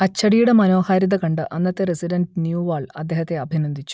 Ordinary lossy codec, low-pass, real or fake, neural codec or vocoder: none; none; real; none